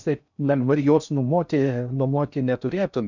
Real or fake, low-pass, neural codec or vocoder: fake; 7.2 kHz; codec, 16 kHz in and 24 kHz out, 0.6 kbps, FocalCodec, streaming, 4096 codes